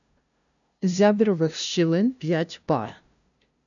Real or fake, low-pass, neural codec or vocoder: fake; 7.2 kHz; codec, 16 kHz, 0.5 kbps, FunCodec, trained on LibriTTS, 25 frames a second